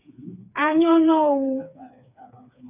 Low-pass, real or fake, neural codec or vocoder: 3.6 kHz; fake; codec, 16 kHz, 4 kbps, FreqCodec, smaller model